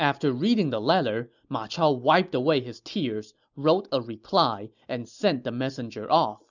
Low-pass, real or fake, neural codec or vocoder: 7.2 kHz; real; none